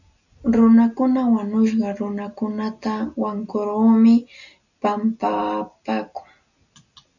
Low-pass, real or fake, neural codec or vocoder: 7.2 kHz; real; none